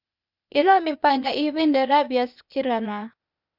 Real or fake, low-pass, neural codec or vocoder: fake; 5.4 kHz; codec, 16 kHz, 0.8 kbps, ZipCodec